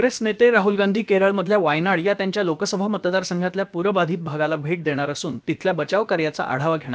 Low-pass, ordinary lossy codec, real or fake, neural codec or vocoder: none; none; fake; codec, 16 kHz, about 1 kbps, DyCAST, with the encoder's durations